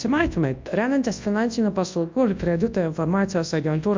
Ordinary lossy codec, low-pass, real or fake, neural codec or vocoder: MP3, 48 kbps; 7.2 kHz; fake; codec, 24 kHz, 0.9 kbps, WavTokenizer, large speech release